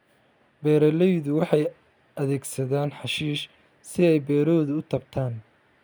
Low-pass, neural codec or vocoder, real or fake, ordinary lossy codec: none; none; real; none